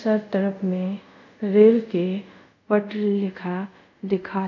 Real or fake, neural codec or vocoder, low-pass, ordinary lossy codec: fake; codec, 24 kHz, 0.5 kbps, DualCodec; 7.2 kHz; none